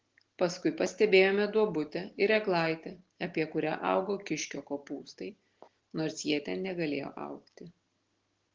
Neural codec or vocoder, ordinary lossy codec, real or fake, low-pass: none; Opus, 16 kbps; real; 7.2 kHz